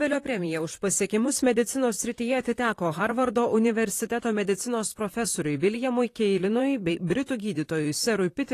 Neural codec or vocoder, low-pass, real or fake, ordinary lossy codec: vocoder, 44.1 kHz, 128 mel bands, Pupu-Vocoder; 14.4 kHz; fake; AAC, 48 kbps